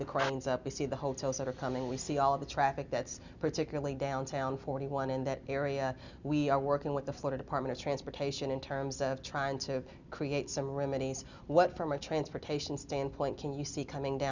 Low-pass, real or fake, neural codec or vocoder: 7.2 kHz; real; none